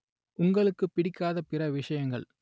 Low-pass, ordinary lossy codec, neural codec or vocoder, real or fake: none; none; none; real